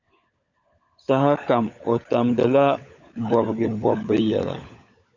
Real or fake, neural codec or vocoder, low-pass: fake; codec, 16 kHz, 16 kbps, FunCodec, trained on LibriTTS, 50 frames a second; 7.2 kHz